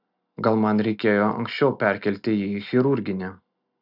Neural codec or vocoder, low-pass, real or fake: none; 5.4 kHz; real